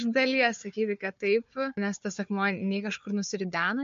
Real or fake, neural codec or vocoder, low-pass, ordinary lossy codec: fake; codec, 16 kHz, 4 kbps, FunCodec, trained on Chinese and English, 50 frames a second; 7.2 kHz; MP3, 48 kbps